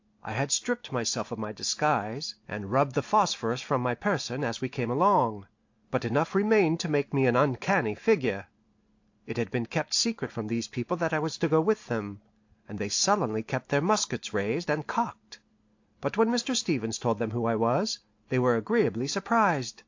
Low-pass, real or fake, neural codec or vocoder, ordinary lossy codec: 7.2 kHz; real; none; AAC, 48 kbps